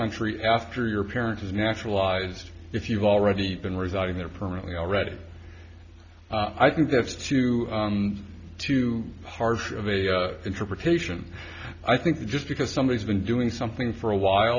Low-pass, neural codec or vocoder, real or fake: 7.2 kHz; none; real